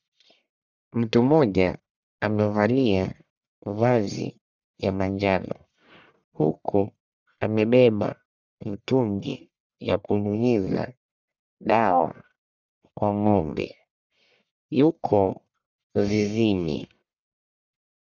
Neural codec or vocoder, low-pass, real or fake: codec, 44.1 kHz, 1.7 kbps, Pupu-Codec; 7.2 kHz; fake